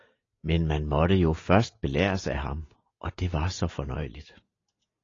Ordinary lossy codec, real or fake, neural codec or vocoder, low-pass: AAC, 32 kbps; real; none; 7.2 kHz